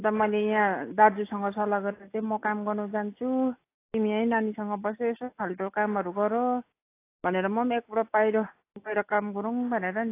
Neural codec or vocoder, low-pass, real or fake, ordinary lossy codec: none; 3.6 kHz; real; AAC, 24 kbps